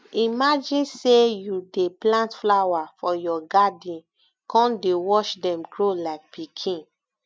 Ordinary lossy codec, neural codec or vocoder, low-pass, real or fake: none; none; none; real